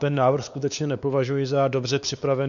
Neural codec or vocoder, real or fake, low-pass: codec, 16 kHz, 2 kbps, X-Codec, WavLM features, trained on Multilingual LibriSpeech; fake; 7.2 kHz